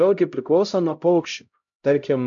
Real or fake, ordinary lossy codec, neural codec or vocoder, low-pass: fake; MP3, 64 kbps; codec, 16 kHz, 0.5 kbps, X-Codec, HuBERT features, trained on LibriSpeech; 7.2 kHz